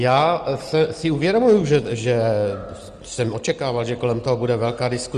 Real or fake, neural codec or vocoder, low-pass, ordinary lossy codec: real; none; 9.9 kHz; Opus, 24 kbps